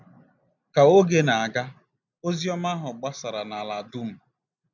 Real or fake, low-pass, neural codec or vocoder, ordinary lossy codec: real; 7.2 kHz; none; none